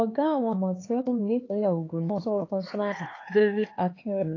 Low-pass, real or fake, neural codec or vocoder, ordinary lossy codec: 7.2 kHz; fake; codec, 16 kHz, 4 kbps, X-Codec, HuBERT features, trained on LibriSpeech; AAC, 32 kbps